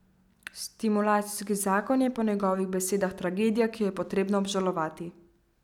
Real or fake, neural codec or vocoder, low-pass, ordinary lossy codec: real; none; 19.8 kHz; none